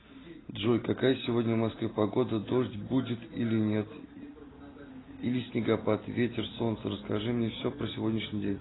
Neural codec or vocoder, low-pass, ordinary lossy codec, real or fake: none; 7.2 kHz; AAC, 16 kbps; real